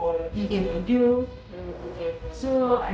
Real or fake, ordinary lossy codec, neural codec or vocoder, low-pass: fake; none; codec, 16 kHz, 0.5 kbps, X-Codec, HuBERT features, trained on general audio; none